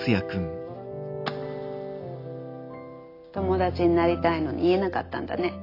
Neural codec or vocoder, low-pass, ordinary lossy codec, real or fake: none; 5.4 kHz; AAC, 32 kbps; real